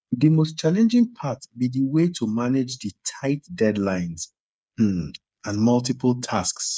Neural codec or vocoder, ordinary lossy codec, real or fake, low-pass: codec, 16 kHz, 8 kbps, FreqCodec, smaller model; none; fake; none